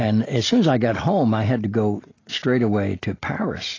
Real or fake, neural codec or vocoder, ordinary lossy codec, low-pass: real; none; AAC, 32 kbps; 7.2 kHz